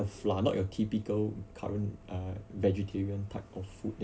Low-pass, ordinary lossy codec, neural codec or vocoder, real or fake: none; none; none; real